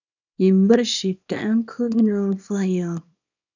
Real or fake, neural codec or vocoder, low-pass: fake; codec, 24 kHz, 0.9 kbps, WavTokenizer, small release; 7.2 kHz